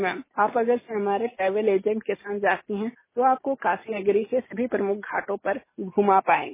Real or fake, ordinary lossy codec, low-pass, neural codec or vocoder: real; MP3, 16 kbps; 3.6 kHz; none